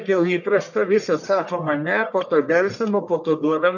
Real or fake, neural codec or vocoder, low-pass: fake; codec, 44.1 kHz, 1.7 kbps, Pupu-Codec; 7.2 kHz